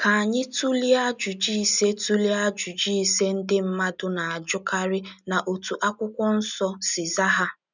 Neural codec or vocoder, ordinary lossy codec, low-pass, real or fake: none; none; 7.2 kHz; real